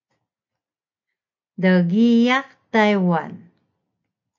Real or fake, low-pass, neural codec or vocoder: real; 7.2 kHz; none